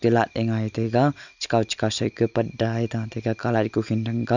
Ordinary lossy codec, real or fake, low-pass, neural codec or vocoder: none; real; 7.2 kHz; none